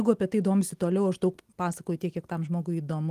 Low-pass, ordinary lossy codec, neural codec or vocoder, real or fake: 14.4 kHz; Opus, 24 kbps; none; real